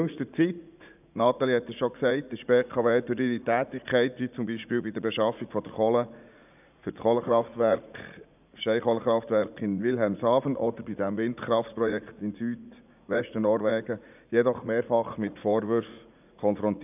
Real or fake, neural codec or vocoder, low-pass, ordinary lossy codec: fake; vocoder, 44.1 kHz, 80 mel bands, Vocos; 3.6 kHz; none